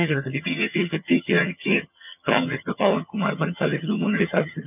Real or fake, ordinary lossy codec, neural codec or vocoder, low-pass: fake; none; vocoder, 22.05 kHz, 80 mel bands, HiFi-GAN; 3.6 kHz